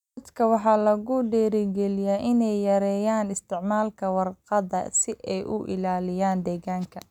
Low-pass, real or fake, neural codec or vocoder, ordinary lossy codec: 19.8 kHz; real; none; none